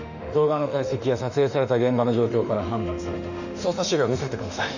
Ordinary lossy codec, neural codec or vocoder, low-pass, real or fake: none; autoencoder, 48 kHz, 32 numbers a frame, DAC-VAE, trained on Japanese speech; 7.2 kHz; fake